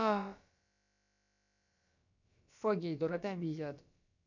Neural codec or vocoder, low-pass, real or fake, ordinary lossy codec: codec, 16 kHz, about 1 kbps, DyCAST, with the encoder's durations; 7.2 kHz; fake; none